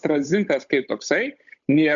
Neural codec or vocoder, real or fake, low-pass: codec, 16 kHz, 8 kbps, FunCodec, trained on Chinese and English, 25 frames a second; fake; 7.2 kHz